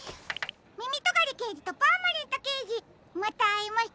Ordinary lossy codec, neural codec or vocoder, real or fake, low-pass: none; none; real; none